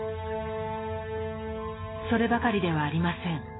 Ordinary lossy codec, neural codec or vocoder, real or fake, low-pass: AAC, 16 kbps; none; real; 7.2 kHz